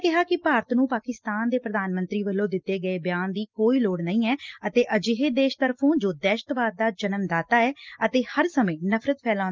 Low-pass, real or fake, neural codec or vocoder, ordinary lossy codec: 7.2 kHz; real; none; Opus, 24 kbps